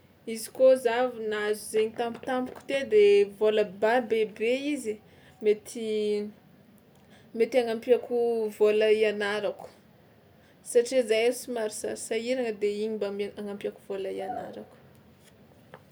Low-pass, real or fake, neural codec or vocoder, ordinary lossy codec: none; real; none; none